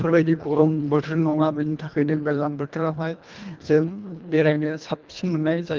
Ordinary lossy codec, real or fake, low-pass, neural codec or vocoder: Opus, 32 kbps; fake; 7.2 kHz; codec, 24 kHz, 1.5 kbps, HILCodec